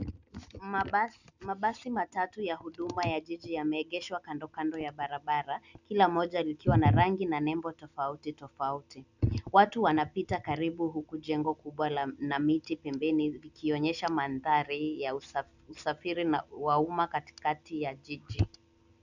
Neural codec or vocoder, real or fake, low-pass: none; real; 7.2 kHz